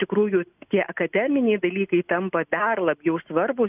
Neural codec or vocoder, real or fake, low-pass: none; real; 3.6 kHz